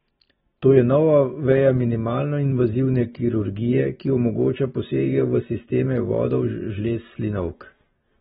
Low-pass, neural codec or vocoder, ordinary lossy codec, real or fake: 19.8 kHz; none; AAC, 16 kbps; real